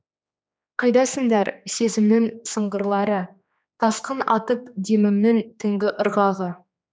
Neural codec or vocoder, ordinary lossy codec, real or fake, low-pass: codec, 16 kHz, 2 kbps, X-Codec, HuBERT features, trained on general audio; none; fake; none